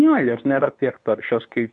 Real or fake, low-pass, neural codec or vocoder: fake; 10.8 kHz; codec, 24 kHz, 0.9 kbps, WavTokenizer, medium speech release version 2